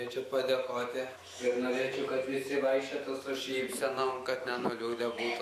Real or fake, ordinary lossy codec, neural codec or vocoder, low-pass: fake; AAC, 64 kbps; autoencoder, 48 kHz, 128 numbers a frame, DAC-VAE, trained on Japanese speech; 14.4 kHz